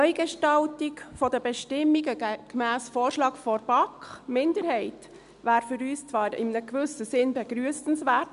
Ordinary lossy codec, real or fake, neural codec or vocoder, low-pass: none; real; none; 10.8 kHz